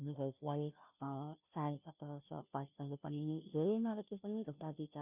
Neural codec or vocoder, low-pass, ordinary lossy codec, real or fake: codec, 16 kHz, 0.5 kbps, FunCodec, trained on Chinese and English, 25 frames a second; 3.6 kHz; none; fake